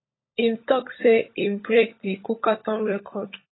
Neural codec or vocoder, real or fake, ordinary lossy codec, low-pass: codec, 16 kHz, 16 kbps, FunCodec, trained on LibriTTS, 50 frames a second; fake; AAC, 16 kbps; 7.2 kHz